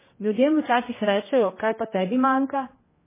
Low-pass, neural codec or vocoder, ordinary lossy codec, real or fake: 3.6 kHz; codec, 16 kHz, 1 kbps, X-Codec, HuBERT features, trained on general audio; MP3, 16 kbps; fake